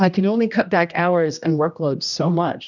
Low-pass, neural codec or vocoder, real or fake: 7.2 kHz; codec, 16 kHz, 1 kbps, X-Codec, HuBERT features, trained on general audio; fake